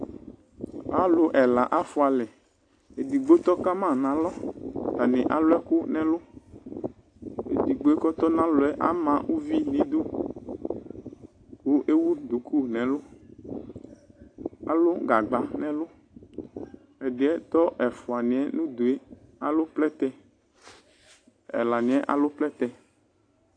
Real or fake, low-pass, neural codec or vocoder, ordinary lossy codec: real; 9.9 kHz; none; AAC, 48 kbps